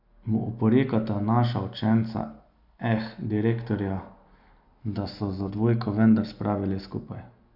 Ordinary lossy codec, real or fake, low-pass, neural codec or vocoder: none; real; 5.4 kHz; none